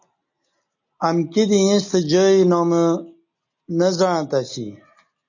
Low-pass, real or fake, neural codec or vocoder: 7.2 kHz; real; none